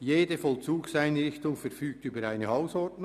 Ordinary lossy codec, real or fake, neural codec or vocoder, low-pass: none; real; none; 14.4 kHz